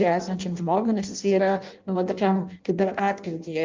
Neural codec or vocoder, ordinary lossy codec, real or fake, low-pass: codec, 16 kHz in and 24 kHz out, 0.6 kbps, FireRedTTS-2 codec; Opus, 32 kbps; fake; 7.2 kHz